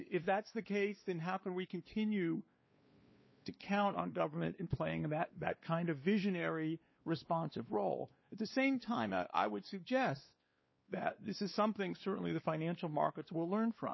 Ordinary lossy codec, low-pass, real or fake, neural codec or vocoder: MP3, 24 kbps; 7.2 kHz; fake; codec, 16 kHz, 2 kbps, X-Codec, WavLM features, trained on Multilingual LibriSpeech